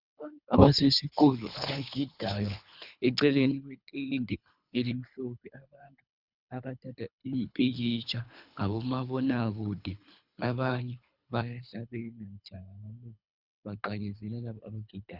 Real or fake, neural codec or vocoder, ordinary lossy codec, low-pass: fake; codec, 24 kHz, 3 kbps, HILCodec; Opus, 64 kbps; 5.4 kHz